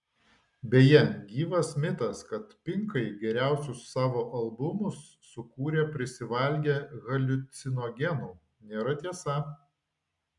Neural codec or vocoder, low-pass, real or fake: none; 10.8 kHz; real